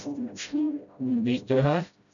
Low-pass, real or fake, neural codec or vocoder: 7.2 kHz; fake; codec, 16 kHz, 0.5 kbps, FreqCodec, smaller model